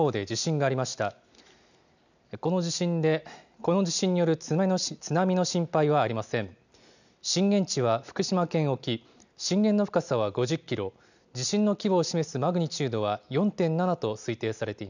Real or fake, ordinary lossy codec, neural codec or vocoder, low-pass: real; none; none; 7.2 kHz